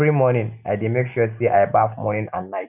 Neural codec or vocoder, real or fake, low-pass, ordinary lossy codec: none; real; 3.6 kHz; none